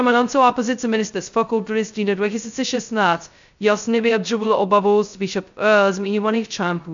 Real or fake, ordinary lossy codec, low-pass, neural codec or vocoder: fake; MP3, 64 kbps; 7.2 kHz; codec, 16 kHz, 0.2 kbps, FocalCodec